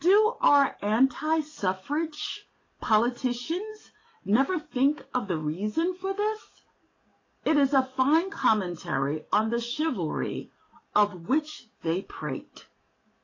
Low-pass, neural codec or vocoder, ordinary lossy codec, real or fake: 7.2 kHz; codec, 44.1 kHz, 7.8 kbps, DAC; AAC, 32 kbps; fake